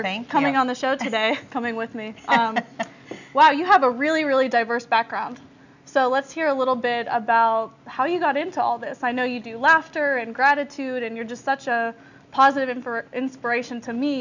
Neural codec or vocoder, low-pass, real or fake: none; 7.2 kHz; real